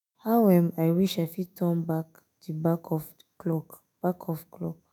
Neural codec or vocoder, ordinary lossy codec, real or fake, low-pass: autoencoder, 48 kHz, 128 numbers a frame, DAC-VAE, trained on Japanese speech; none; fake; none